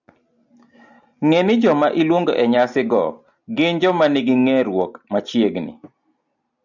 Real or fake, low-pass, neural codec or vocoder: real; 7.2 kHz; none